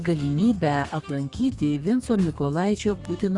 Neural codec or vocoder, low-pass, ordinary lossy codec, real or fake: codec, 32 kHz, 1.9 kbps, SNAC; 10.8 kHz; Opus, 64 kbps; fake